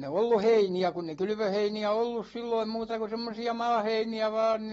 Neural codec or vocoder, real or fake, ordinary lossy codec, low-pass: none; real; AAC, 24 kbps; 19.8 kHz